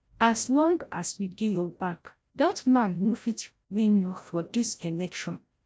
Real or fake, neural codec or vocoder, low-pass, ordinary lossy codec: fake; codec, 16 kHz, 0.5 kbps, FreqCodec, larger model; none; none